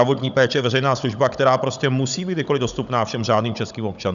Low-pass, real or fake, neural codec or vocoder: 7.2 kHz; fake; codec, 16 kHz, 16 kbps, FunCodec, trained on Chinese and English, 50 frames a second